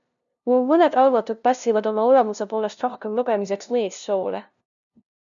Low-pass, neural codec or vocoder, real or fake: 7.2 kHz; codec, 16 kHz, 0.5 kbps, FunCodec, trained on LibriTTS, 25 frames a second; fake